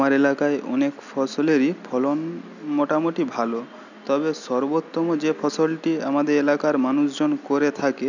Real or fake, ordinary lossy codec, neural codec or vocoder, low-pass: real; none; none; 7.2 kHz